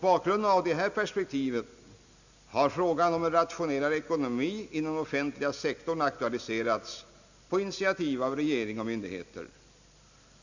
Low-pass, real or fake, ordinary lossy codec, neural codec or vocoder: 7.2 kHz; real; none; none